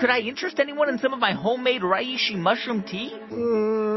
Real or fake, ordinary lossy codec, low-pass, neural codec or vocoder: real; MP3, 24 kbps; 7.2 kHz; none